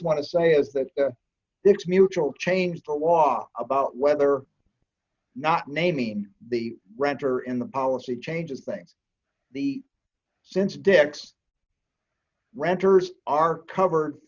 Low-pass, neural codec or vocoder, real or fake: 7.2 kHz; none; real